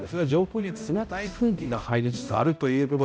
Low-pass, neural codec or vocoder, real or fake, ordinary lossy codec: none; codec, 16 kHz, 0.5 kbps, X-Codec, HuBERT features, trained on balanced general audio; fake; none